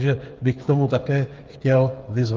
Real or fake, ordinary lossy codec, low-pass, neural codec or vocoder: fake; Opus, 32 kbps; 7.2 kHz; codec, 16 kHz, 8 kbps, FreqCodec, smaller model